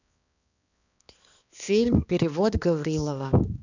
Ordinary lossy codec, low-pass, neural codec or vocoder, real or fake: none; 7.2 kHz; codec, 16 kHz, 2 kbps, X-Codec, HuBERT features, trained on balanced general audio; fake